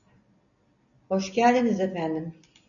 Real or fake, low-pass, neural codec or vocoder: real; 7.2 kHz; none